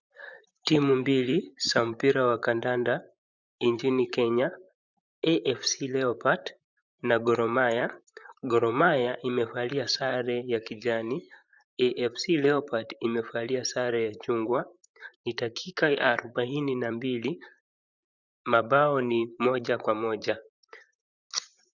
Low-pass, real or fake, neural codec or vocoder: 7.2 kHz; fake; vocoder, 44.1 kHz, 128 mel bands, Pupu-Vocoder